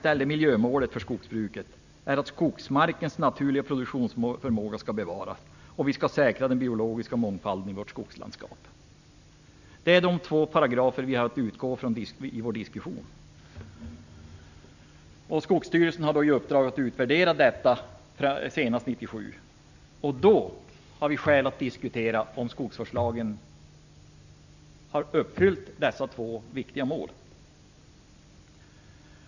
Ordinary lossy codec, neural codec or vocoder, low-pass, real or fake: none; none; 7.2 kHz; real